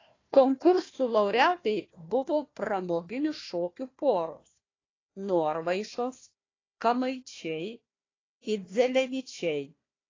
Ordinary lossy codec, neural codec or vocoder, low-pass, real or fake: AAC, 32 kbps; codec, 16 kHz, 1 kbps, FunCodec, trained on Chinese and English, 50 frames a second; 7.2 kHz; fake